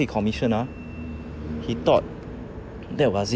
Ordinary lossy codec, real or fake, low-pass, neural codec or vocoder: none; real; none; none